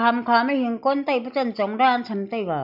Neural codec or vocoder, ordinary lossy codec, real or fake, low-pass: none; AAC, 48 kbps; real; 5.4 kHz